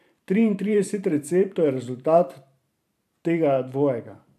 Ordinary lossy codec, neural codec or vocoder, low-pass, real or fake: AAC, 96 kbps; vocoder, 44.1 kHz, 128 mel bands every 512 samples, BigVGAN v2; 14.4 kHz; fake